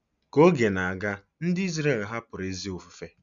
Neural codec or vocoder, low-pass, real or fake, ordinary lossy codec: none; 7.2 kHz; real; none